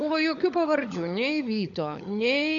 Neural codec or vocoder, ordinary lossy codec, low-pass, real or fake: codec, 16 kHz, 16 kbps, FunCodec, trained on LibriTTS, 50 frames a second; MP3, 96 kbps; 7.2 kHz; fake